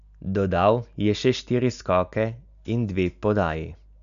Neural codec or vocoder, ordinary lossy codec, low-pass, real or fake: none; none; 7.2 kHz; real